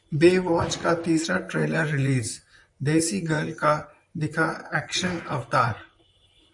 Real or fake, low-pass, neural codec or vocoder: fake; 10.8 kHz; vocoder, 44.1 kHz, 128 mel bands, Pupu-Vocoder